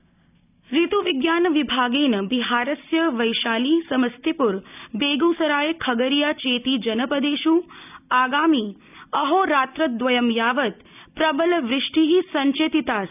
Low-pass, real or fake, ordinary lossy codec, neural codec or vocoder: 3.6 kHz; real; none; none